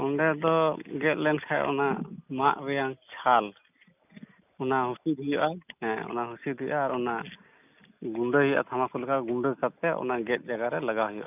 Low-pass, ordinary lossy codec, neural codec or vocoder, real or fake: 3.6 kHz; none; none; real